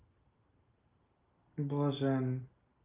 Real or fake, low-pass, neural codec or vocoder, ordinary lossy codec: real; 3.6 kHz; none; Opus, 32 kbps